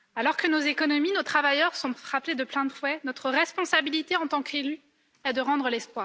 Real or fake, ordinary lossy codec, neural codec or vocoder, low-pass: real; none; none; none